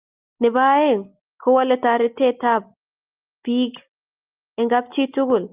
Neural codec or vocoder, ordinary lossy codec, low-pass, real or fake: none; Opus, 32 kbps; 3.6 kHz; real